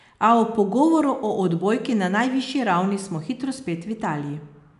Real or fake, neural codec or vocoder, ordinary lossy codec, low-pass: real; none; none; 10.8 kHz